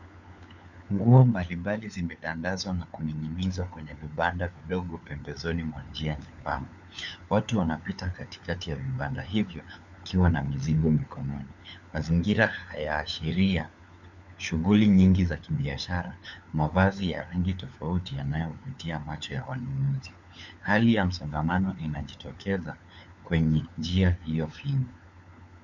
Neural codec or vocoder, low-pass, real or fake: codec, 16 kHz, 4 kbps, FunCodec, trained on LibriTTS, 50 frames a second; 7.2 kHz; fake